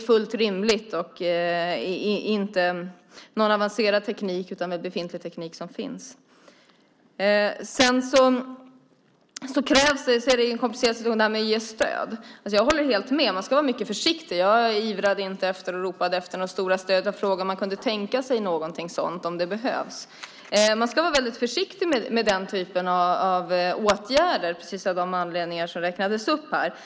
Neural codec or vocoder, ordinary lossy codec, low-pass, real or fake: none; none; none; real